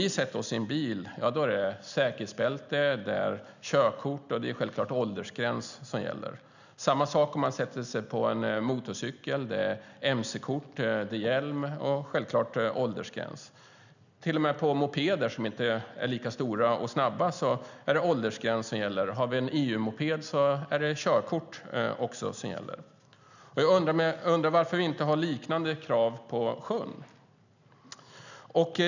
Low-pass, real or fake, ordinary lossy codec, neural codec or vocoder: 7.2 kHz; real; none; none